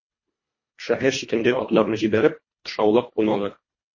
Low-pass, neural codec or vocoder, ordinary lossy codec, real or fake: 7.2 kHz; codec, 24 kHz, 1.5 kbps, HILCodec; MP3, 32 kbps; fake